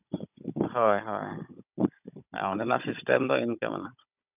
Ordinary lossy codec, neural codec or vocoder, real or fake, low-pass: none; codec, 16 kHz, 16 kbps, FunCodec, trained on Chinese and English, 50 frames a second; fake; 3.6 kHz